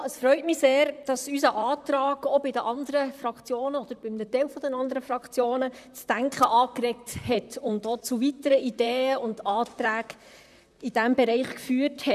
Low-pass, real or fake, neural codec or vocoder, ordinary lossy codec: 14.4 kHz; fake; vocoder, 44.1 kHz, 128 mel bands, Pupu-Vocoder; none